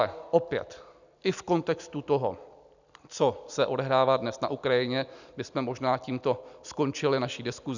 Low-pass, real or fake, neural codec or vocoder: 7.2 kHz; real; none